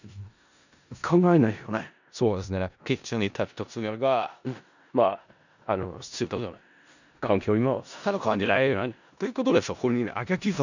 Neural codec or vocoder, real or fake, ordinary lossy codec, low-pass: codec, 16 kHz in and 24 kHz out, 0.4 kbps, LongCat-Audio-Codec, four codebook decoder; fake; none; 7.2 kHz